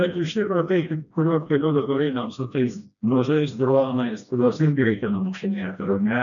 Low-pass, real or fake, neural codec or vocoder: 7.2 kHz; fake; codec, 16 kHz, 1 kbps, FreqCodec, smaller model